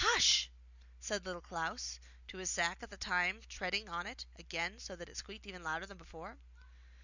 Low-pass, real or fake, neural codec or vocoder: 7.2 kHz; real; none